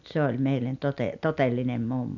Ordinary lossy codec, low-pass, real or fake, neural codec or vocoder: none; 7.2 kHz; real; none